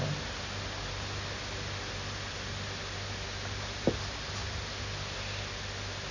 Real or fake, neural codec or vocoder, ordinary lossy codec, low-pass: real; none; none; 7.2 kHz